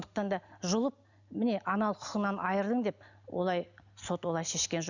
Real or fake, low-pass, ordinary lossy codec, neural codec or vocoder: real; 7.2 kHz; none; none